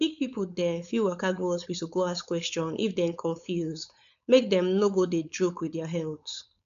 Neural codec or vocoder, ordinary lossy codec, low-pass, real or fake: codec, 16 kHz, 4.8 kbps, FACodec; none; 7.2 kHz; fake